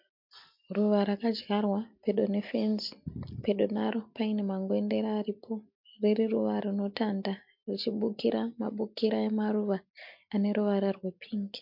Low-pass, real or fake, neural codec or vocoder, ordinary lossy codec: 5.4 kHz; real; none; MP3, 48 kbps